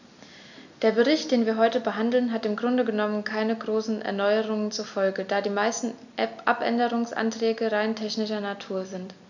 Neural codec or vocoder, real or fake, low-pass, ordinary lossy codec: none; real; 7.2 kHz; none